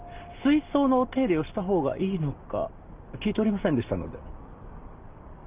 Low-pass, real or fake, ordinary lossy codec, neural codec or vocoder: 3.6 kHz; real; Opus, 32 kbps; none